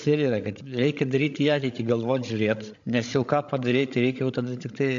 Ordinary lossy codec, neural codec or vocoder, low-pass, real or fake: AAC, 64 kbps; codec, 16 kHz, 16 kbps, FreqCodec, larger model; 7.2 kHz; fake